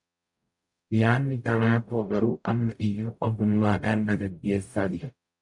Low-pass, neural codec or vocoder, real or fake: 10.8 kHz; codec, 44.1 kHz, 0.9 kbps, DAC; fake